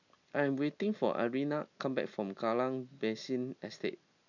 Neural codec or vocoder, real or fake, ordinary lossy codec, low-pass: none; real; none; 7.2 kHz